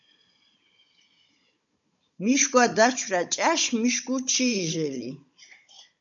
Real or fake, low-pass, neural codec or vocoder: fake; 7.2 kHz; codec, 16 kHz, 16 kbps, FunCodec, trained on Chinese and English, 50 frames a second